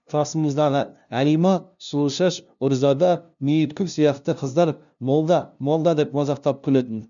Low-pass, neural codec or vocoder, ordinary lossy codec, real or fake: 7.2 kHz; codec, 16 kHz, 0.5 kbps, FunCodec, trained on LibriTTS, 25 frames a second; none; fake